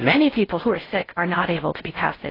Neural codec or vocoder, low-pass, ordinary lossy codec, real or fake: codec, 16 kHz in and 24 kHz out, 0.6 kbps, FocalCodec, streaming, 4096 codes; 5.4 kHz; AAC, 24 kbps; fake